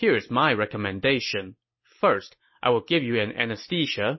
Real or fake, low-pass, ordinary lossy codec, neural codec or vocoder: real; 7.2 kHz; MP3, 24 kbps; none